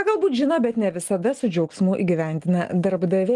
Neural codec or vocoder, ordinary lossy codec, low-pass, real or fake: none; Opus, 24 kbps; 10.8 kHz; real